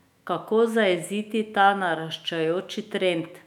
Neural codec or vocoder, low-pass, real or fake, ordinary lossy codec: autoencoder, 48 kHz, 128 numbers a frame, DAC-VAE, trained on Japanese speech; 19.8 kHz; fake; none